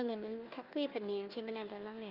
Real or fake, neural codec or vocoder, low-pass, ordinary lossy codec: fake; codec, 16 kHz, 1 kbps, FunCodec, trained on Chinese and English, 50 frames a second; 5.4 kHz; Opus, 24 kbps